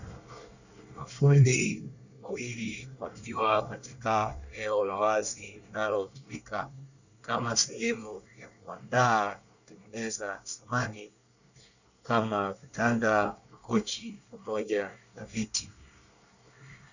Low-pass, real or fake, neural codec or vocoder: 7.2 kHz; fake; codec, 24 kHz, 1 kbps, SNAC